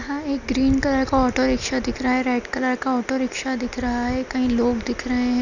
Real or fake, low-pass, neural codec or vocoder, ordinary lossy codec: real; 7.2 kHz; none; none